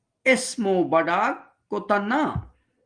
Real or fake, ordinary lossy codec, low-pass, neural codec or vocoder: real; Opus, 24 kbps; 9.9 kHz; none